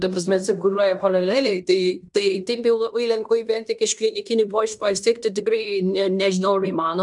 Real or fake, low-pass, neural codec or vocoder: fake; 10.8 kHz; codec, 16 kHz in and 24 kHz out, 0.9 kbps, LongCat-Audio-Codec, fine tuned four codebook decoder